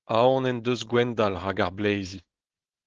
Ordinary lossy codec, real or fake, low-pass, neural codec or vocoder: Opus, 16 kbps; fake; 7.2 kHz; codec, 16 kHz, 4.8 kbps, FACodec